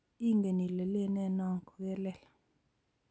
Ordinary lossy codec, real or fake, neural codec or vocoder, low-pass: none; real; none; none